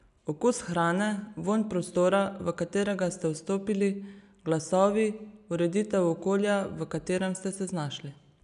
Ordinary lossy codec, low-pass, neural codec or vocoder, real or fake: none; 10.8 kHz; none; real